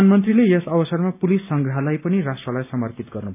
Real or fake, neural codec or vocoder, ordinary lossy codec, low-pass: real; none; AAC, 32 kbps; 3.6 kHz